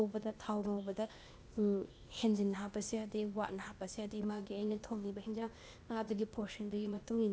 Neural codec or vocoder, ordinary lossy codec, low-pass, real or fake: codec, 16 kHz, 0.8 kbps, ZipCodec; none; none; fake